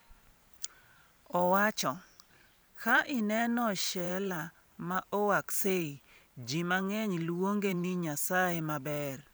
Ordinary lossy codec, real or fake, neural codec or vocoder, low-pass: none; fake; vocoder, 44.1 kHz, 128 mel bands every 512 samples, BigVGAN v2; none